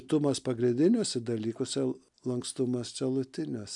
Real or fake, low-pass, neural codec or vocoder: real; 10.8 kHz; none